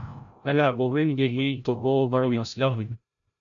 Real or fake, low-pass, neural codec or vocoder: fake; 7.2 kHz; codec, 16 kHz, 0.5 kbps, FreqCodec, larger model